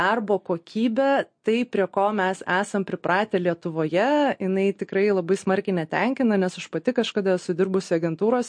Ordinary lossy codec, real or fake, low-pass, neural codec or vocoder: MP3, 48 kbps; real; 9.9 kHz; none